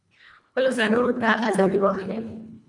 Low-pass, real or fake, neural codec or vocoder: 10.8 kHz; fake; codec, 24 kHz, 1.5 kbps, HILCodec